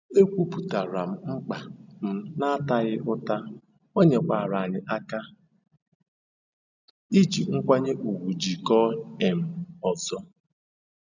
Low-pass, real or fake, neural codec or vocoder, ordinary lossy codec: 7.2 kHz; real; none; none